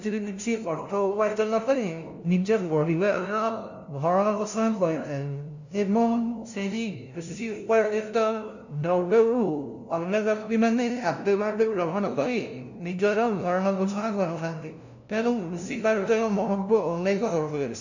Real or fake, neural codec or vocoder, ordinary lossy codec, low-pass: fake; codec, 16 kHz, 0.5 kbps, FunCodec, trained on LibriTTS, 25 frames a second; none; 7.2 kHz